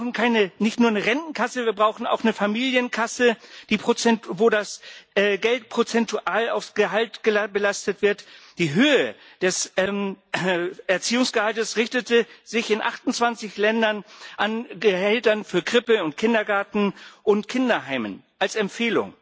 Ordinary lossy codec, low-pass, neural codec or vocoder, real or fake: none; none; none; real